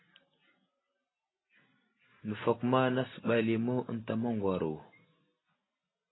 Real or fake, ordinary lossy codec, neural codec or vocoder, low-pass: real; AAC, 16 kbps; none; 7.2 kHz